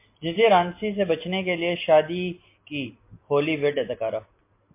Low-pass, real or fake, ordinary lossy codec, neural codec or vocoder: 3.6 kHz; real; MP3, 24 kbps; none